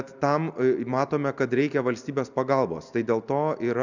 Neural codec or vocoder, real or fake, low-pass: none; real; 7.2 kHz